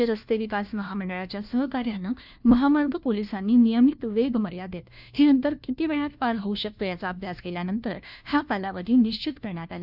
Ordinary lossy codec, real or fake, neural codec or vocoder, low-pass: none; fake; codec, 16 kHz, 1 kbps, FunCodec, trained on LibriTTS, 50 frames a second; 5.4 kHz